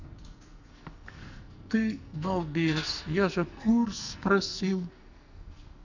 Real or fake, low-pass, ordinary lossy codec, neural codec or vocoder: fake; 7.2 kHz; none; codec, 44.1 kHz, 2.6 kbps, SNAC